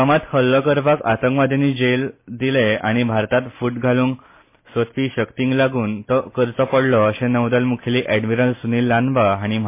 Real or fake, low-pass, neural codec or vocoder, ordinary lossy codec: real; 3.6 kHz; none; MP3, 24 kbps